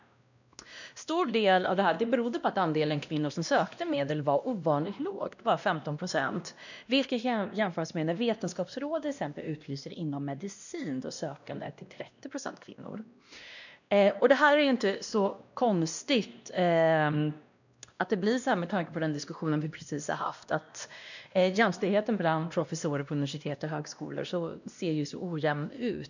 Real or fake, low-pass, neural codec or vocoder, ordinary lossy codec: fake; 7.2 kHz; codec, 16 kHz, 1 kbps, X-Codec, WavLM features, trained on Multilingual LibriSpeech; none